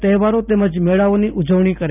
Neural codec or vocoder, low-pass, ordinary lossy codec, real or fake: none; 3.6 kHz; none; real